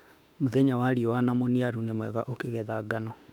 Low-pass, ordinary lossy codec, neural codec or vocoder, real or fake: 19.8 kHz; none; autoencoder, 48 kHz, 32 numbers a frame, DAC-VAE, trained on Japanese speech; fake